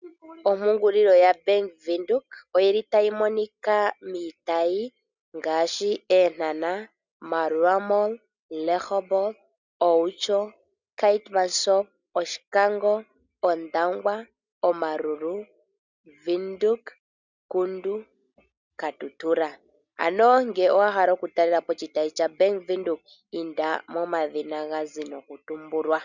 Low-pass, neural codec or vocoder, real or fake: 7.2 kHz; none; real